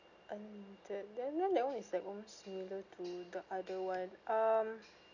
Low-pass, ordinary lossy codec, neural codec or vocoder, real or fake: 7.2 kHz; none; none; real